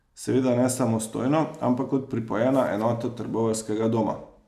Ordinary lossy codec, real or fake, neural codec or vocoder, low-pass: none; real; none; 14.4 kHz